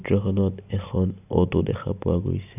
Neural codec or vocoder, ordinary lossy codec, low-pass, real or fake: none; none; 3.6 kHz; real